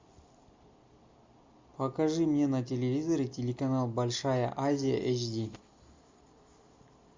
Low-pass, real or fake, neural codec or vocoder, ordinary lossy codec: 7.2 kHz; real; none; MP3, 64 kbps